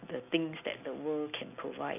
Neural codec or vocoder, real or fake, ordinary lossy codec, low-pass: none; real; none; 3.6 kHz